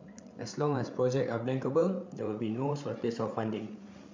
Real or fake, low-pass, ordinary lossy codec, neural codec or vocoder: fake; 7.2 kHz; MP3, 64 kbps; codec, 16 kHz, 8 kbps, FreqCodec, larger model